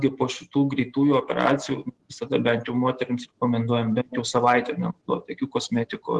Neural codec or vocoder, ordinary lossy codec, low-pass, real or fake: none; Opus, 16 kbps; 10.8 kHz; real